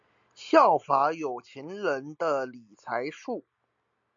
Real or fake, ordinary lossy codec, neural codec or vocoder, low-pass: real; AAC, 64 kbps; none; 7.2 kHz